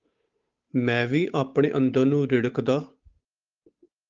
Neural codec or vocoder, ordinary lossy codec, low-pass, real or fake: codec, 16 kHz, 8 kbps, FunCodec, trained on Chinese and English, 25 frames a second; Opus, 32 kbps; 7.2 kHz; fake